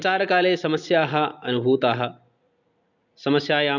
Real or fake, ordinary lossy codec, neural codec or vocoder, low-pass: real; none; none; 7.2 kHz